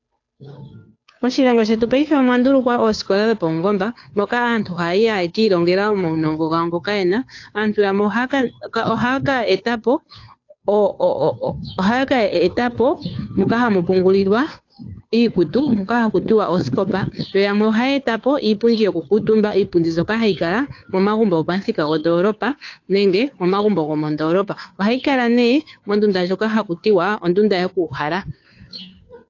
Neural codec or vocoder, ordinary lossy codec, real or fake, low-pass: codec, 16 kHz, 2 kbps, FunCodec, trained on Chinese and English, 25 frames a second; AAC, 48 kbps; fake; 7.2 kHz